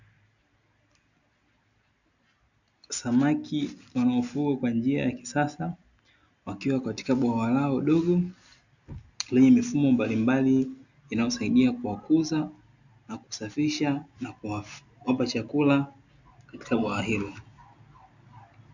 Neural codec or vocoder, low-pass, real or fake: none; 7.2 kHz; real